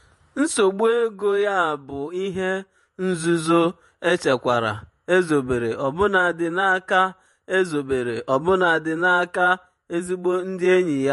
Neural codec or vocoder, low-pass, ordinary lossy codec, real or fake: vocoder, 48 kHz, 128 mel bands, Vocos; 14.4 kHz; MP3, 48 kbps; fake